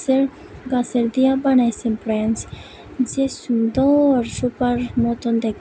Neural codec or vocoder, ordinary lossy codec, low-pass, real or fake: none; none; none; real